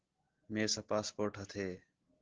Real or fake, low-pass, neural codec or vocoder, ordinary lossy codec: real; 7.2 kHz; none; Opus, 16 kbps